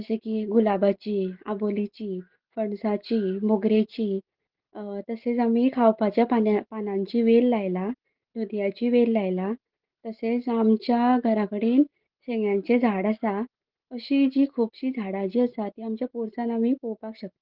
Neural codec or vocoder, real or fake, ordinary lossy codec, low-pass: none; real; Opus, 16 kbps; 5.4 kHz